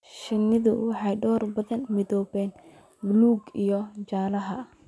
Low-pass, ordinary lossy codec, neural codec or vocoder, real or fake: none; none; none; real